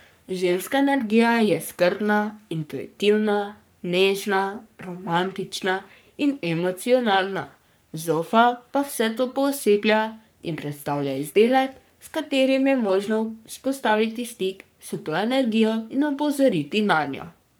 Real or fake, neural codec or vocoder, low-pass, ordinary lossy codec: fake; codec, 44.1 kHz, 3.4 kbps, Pupu-Codec; none; none